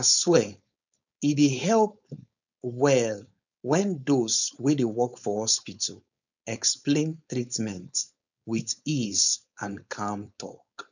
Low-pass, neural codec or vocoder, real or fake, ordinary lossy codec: 7.2 kHz; codec, 16 kHz, 4.8 kbps, FACodec; fake; none